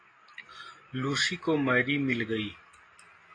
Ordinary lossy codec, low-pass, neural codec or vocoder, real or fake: AAC, 32 kbps; 9.9 kHz; none; real